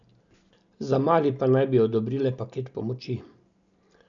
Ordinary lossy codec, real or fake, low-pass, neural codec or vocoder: none; real; 7.2 kHz; none